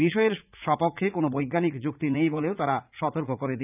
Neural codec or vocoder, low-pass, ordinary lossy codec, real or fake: vocoder, 44.1 kHz, 80 mel bands, Vocos; 3.6 kHz; none; fake